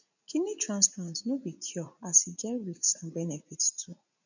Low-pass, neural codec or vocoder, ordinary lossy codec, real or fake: 7.2 kHz; vocoder, 44.1 kHz, 80 mel bands, Vocos; none; fake